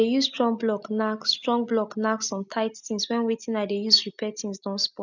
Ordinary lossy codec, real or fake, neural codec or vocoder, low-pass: none; real; none; 7.2 kHz